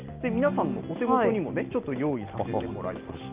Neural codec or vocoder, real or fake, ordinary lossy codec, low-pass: none; real; Opus, 24 kbps; 3.6 kHz